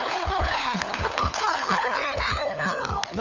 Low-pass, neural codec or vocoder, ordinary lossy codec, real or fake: 7.2 kHz; codec, 16 kHz, 4 kbps, FunCodec, trained on LibriTTS, 50 frames a second; none; fake